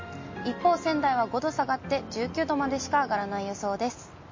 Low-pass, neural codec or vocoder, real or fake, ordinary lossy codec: 7.2 kHz; none; real; MP3, 32 kbps